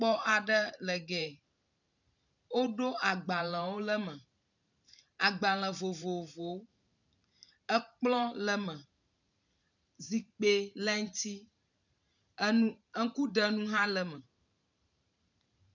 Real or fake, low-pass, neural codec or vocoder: fake; 7.2 kHz; vocoder, 44.1 kHz, 128 mel bands every 512 samples, BigVGAN v2